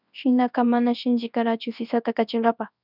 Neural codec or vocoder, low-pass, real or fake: codec, 24 kHz, 0.9 kbps, WavTokenizer, large speech release; 5.4 kHz; fake